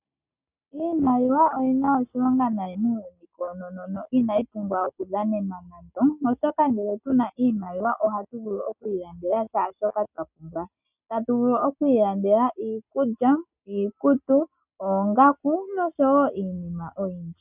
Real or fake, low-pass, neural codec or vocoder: real; 3.6 kHz; none